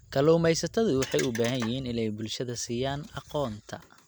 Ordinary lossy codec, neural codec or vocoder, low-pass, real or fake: none; none; none; real